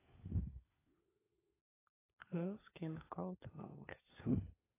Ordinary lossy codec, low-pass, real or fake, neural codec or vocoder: AAC, 16 kbps; 3.6 kHz; fake; codec, 16 kHz, 4 kbps, FunCodec, trained on LibriTTS, 50 frames a second